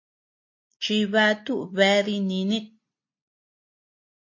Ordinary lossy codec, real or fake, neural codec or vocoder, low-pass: MP3, 32 kbps; real; none; 7.2 kHz